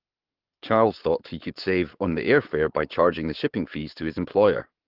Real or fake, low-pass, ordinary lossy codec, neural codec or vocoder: fake; 5.4 kHz; Opus, 16 kbps; codec, 44.1 kHz, 7.8 kbps, Pupu-Codec